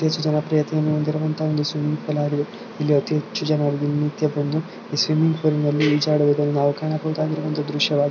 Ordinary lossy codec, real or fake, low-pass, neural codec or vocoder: none; real; 7.2 kHz; none